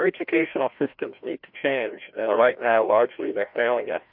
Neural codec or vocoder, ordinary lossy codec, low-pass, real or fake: codec, 16 kHz, 1 kbps, FreqCodec, larger model; MP3, 48 kbps; 5.4 kHz; fake